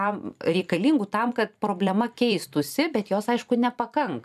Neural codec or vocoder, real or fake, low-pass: vocoder, 44.1 kHz, 128 mel bands every 256 samples, BigVGAN v2; fake; 14.4 kHz